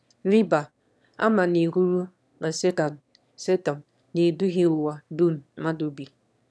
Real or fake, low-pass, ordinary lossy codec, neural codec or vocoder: fake; none; none; autoencoder, 22.05 kHz, a latent of 192 numbers a frame, VITS, trained on one speaker